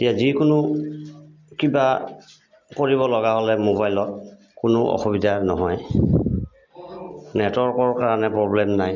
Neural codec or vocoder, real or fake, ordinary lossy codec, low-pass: none; real; MP3, 64 kbps; 7.2 kHz